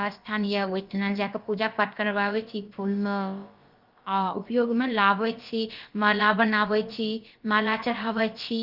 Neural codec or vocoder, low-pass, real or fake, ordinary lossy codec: codec, 16 kHz, about 1 kbps, DyCAST, with the encoder's durations; 5.4 kHz; fake; Opus, 32 kbps